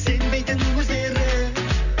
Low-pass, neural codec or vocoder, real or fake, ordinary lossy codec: 7.2 kHz; none; real; none